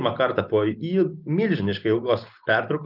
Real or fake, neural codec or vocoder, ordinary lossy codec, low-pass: real; none; Opus, 24 kbps; 5.4 kHz